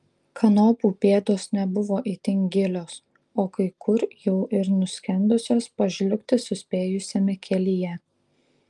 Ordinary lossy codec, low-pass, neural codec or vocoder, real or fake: Opus, 32 kbps; 10.8 kHz; none; real